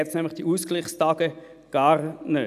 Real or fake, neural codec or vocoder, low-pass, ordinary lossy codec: real; none; 14.4 kHz; none